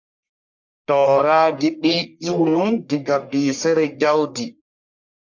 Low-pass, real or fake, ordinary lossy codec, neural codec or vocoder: 7.2 kHz; fake; MP3, 64 kbps; codec, 44.1 kHz, 1.7 kbps, Pupu-Codec